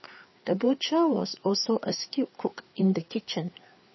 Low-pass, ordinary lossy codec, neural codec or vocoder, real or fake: 7.2 kHz; MP3, 24 kbps; codec, 16 kHz, 4 kbps, FreqCodec, larger model; fake